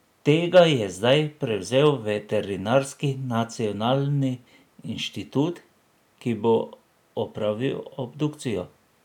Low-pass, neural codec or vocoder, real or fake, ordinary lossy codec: 19.8 kHz; none; real; none